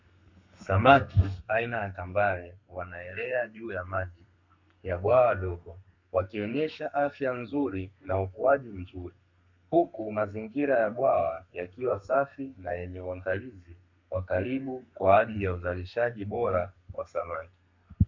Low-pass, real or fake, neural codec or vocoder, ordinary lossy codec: 7.2 kHz; fake; codec, 32 kHz, 1.9 kbps, SNAC; MP3, 64 kbps